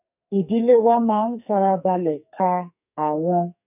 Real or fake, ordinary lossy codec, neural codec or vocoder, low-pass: fake; none; codec, 44.1 kHz, 2.6 kbps, SNAC; 3.6 kHz